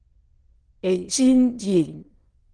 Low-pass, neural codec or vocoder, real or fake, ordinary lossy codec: 9.9 kHz; autoencoder, 22.05 kHz, a latent of 192 numbers a frame, VITS, trained on many speakers; fake; Opus, 16 kbps